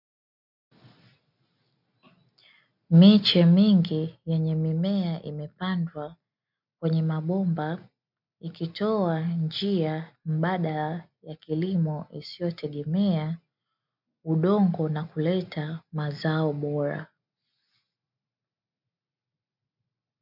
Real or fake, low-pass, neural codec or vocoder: real; 5.4 kHz; none